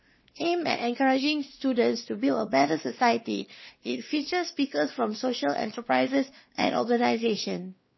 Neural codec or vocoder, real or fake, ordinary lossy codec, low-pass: codec, 16 kHz, 2 kbps, X-Codec, WavLM features, trained on Multilingual LibriSpeech; fake; MP3, 24 kbps; 7.2 kHz